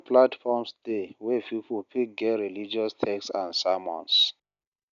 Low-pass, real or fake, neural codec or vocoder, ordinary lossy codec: 7.2 kHz; real; none; none